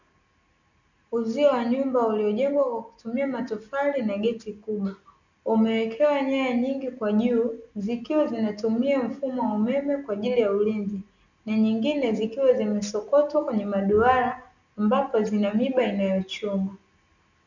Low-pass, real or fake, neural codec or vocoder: 7.2 kHz; real; none